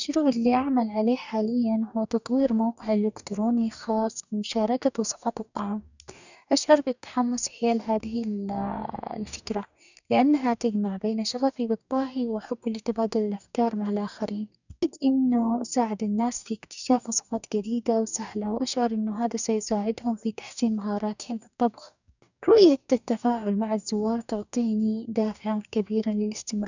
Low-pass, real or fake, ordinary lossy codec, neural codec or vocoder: 7.2 kHz; fake; none; codec, 44.1 kHz, 2.6 kbps, DAC